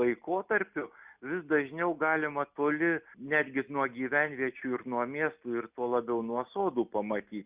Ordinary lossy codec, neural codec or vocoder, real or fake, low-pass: Opus, 64 kbps; none; real; 3.6 kHz